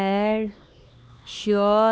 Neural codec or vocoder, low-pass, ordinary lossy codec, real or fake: codec, 16 kHz, 2 kbps, X-Codec, HuBERT features, trained on LibriSpeech; none; none; fake